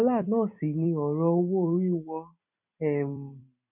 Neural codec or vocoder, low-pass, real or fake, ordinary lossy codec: vocoder, 44.1 kHz, 128 mel bands every 256 samples, BigVGAN v2; 3.6 kHz; fake; none